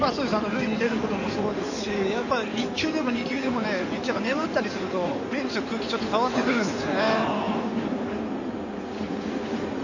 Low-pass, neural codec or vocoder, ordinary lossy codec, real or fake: 7.2 kHz; codec, 16 kHz in and 24 kHz out, 2.2 kbps, FireRedTTS-2 codec; none; fake